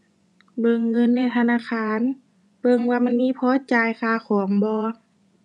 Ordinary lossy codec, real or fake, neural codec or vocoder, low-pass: none; fake; vocoder, 24 kHz, 100 mel bands, Vocos; none